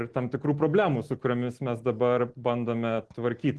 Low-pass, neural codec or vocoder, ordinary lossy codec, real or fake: 10.8 kHz; none; Opus, 16 kbps; real